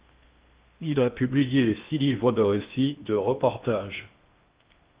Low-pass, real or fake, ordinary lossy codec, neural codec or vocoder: 3.6 kHz; fake; Opus, 24 kbps; codec, 16 kHz in and 24 kHz out, 0.6 kbps, FocalCodec, streaming, 4096 codes